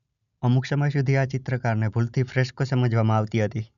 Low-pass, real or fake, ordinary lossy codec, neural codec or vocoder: 7.2 kHz; real; none; none